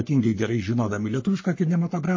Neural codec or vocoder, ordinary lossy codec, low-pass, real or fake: codec, 44.1 kHz, 3.4 kbps, Pupu-Codec; MP3, 32 kbps; 7.2 kHz; fake